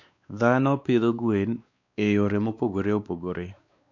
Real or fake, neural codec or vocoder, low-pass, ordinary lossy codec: fake; codec, 16 kHz, 2 kbps, X-Codec, WavLM features, trained on Multilingual LibriSpeech; 7.2 kHz; none